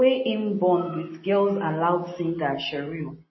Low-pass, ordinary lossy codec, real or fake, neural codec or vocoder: 7.2 kHz; MP3, 24 kbps; real; none